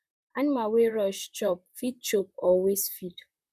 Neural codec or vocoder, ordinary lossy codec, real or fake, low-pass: vocoder, 44.1 kHz, 128 mel bands, Pupu-Vocoder; none; fake; 14.4 kHz